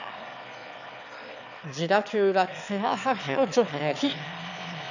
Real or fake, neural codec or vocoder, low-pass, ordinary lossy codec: fake; autoencoder, 22.05 kHz, a latent of 192 numbers a frame, VITS, trained on one speaker; 7.2 kHz; none